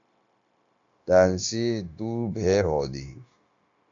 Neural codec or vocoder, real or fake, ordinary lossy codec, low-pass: codec, 16 kHz, 0.9 kbps, LongCat-Audio-Codec; fake; AAC, 48 kbps; 7.2 kHz